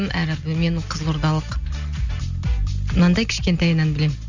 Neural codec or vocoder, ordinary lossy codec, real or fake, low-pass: none; Opus, 64 kbps; real; 7.2 kHz